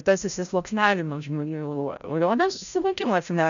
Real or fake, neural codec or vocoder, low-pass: fake; codec, 16 kHz, 0.5 kbps, FreqCodec, larger model; 7.2 kHz